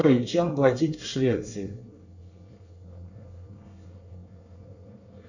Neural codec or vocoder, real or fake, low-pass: codec, 24 kHz, 1 kbps, SNAC; fake; 7.2 kHz